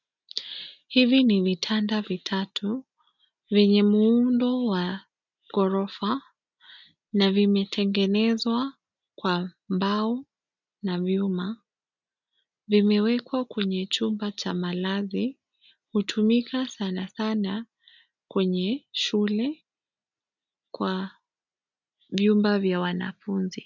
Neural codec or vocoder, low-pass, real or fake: none; 7.2 kHz; real